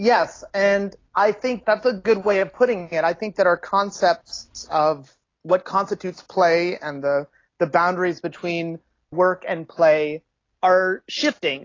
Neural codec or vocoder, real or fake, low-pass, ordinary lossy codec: none; real; 7.2 kHz; AAC, 32 kbps